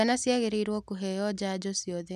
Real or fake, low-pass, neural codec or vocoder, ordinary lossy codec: real; 14.4 kHz; none; none